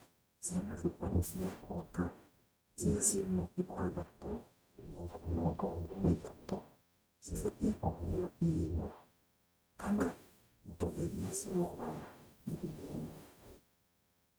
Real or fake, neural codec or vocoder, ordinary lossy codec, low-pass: fake; codec, 44.1 kHz, 0.9 kbps, DAC; none; none